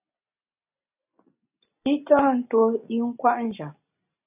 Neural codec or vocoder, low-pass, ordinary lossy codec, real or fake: none; 3.6 kHz; AAC, 32 kbps; real